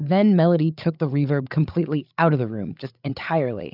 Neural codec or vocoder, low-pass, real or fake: codec, 16 kHz, 8 kbps, FreqCodec, larger model; 5.4 kHz; fake